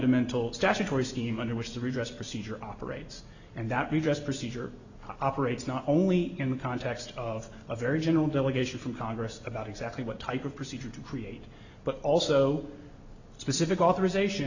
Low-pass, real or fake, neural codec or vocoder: 7.2 kHz; real; none